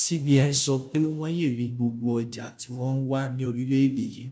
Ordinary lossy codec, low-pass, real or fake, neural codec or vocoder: none; none; fake; codec, 16 kHz, 0.5 kbps, FunCodec, trained on Chinese and English, 25 frames a second